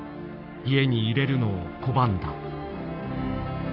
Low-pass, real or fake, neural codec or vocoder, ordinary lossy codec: 5.4 kHz; fake; codec, 44.1 kHz, 7.8 kbps, Pupu-Codec; none